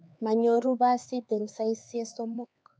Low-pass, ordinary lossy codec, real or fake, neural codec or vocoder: none; none; fake; codec, 16 kHz, 4 kbps, X-Codec, HuBERT features, trained on LibriSpeech